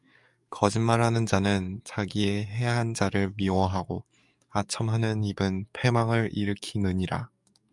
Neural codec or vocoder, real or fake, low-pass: codec, 44.1 kHz, 7.8 kbps, DAC; fake; 10.8 kHz